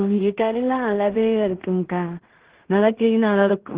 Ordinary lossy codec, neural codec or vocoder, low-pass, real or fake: Opus, 16 kbps; codec, 16 kHz in and 24 kHz out, 0.4 kbps, LongCat-Audio-Codec, two codebook decoder; 3.6 kHz; fake